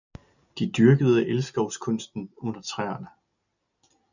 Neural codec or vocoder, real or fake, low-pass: none; real; 7.2 kHz